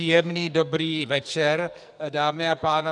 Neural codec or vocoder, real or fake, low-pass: codec, 44.1 kHz, 2.6 kbps, SNAC; fake; 10.8 kHz